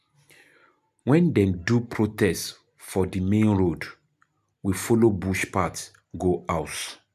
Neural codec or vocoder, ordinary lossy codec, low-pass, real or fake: none; none; 14.4 kHz; real